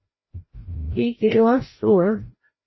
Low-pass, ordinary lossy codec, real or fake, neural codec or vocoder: 7.2 kHz; MP3, 24 kbps; fake; codec, 16 kHz, 0.5 kbps, FreqCodec, larger model